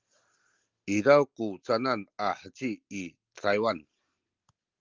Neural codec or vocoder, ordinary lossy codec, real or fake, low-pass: none; Opus, 32 kbps; real; 7.2 kHz